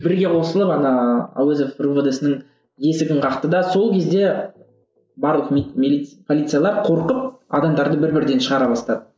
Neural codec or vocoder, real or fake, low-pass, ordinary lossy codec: none; real; none; none